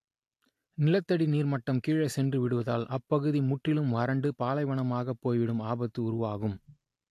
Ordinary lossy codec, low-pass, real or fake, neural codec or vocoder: AAC, 64 kbps; 14.4 kHz; real; none